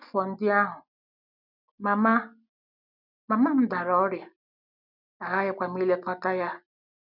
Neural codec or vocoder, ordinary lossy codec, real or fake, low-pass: none; none; real; 5.4 kHz